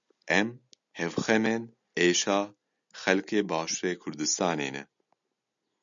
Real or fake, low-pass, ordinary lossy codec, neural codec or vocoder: real; 7.2 kHz; MP3, 64 kbps; none